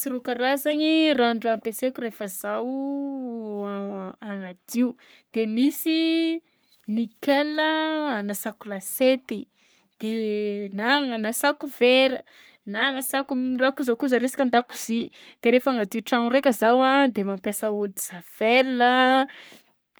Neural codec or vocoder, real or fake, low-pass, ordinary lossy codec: codec, 44.1 kHz, 3.4 kbps, Pupu-Codec; fake; none; none